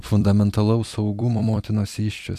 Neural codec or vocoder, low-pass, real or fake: vocoder, 44.1 kHz, 128 mel bands every 256 samples, BigVGAN v2; 14.4 kHz; fake